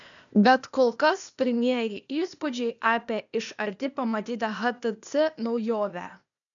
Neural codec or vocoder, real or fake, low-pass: codec, 16 kHz, 0.8 kbps, ZipCodec; fake; 7.2 kHz